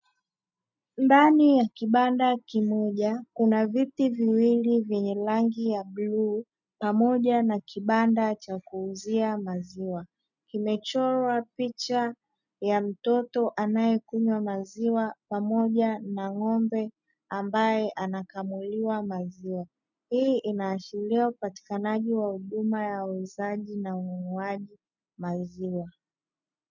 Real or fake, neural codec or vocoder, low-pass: real; none; 7.2 kHz